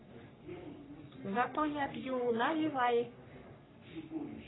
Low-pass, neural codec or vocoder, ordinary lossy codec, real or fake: 7.2 kHz; codec, 44.1 kHz, 3.4 kbps, Pupu-Codec; AAC, 16 kbps; fake